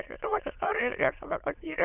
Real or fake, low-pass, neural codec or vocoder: fake; 3.6 kHz; autoencoder, 22.05 kHz, a latent of 192 numbers a frame, VITS, trained on many speakers